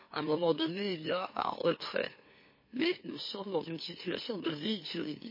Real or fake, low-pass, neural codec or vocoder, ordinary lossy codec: fake; 5.4 kHz; autoencoder, 44.1 kHz, a latent of 192 numbers a frame, MeloTTS; MP3, 24 kbps